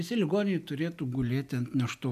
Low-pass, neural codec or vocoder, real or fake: 14.4 kHz; none; real